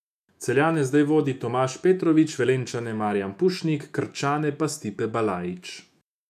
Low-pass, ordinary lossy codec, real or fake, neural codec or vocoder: 14.4 kHz; none; fake; autoencoder, 48 kHz, 128 numbers a frame, DAC-VAE, trained on Japanese speech